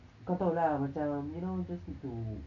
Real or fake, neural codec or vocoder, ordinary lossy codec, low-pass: real; none; none; 7.2 kHz